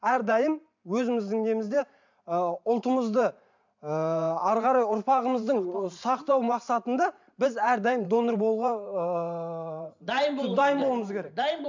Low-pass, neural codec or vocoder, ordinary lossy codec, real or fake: 7.2 kHz; vocoder, 44.1 kHz, 128 mel bands every 512 samples, BigVGAN v2; MP3, 64 kbps; fake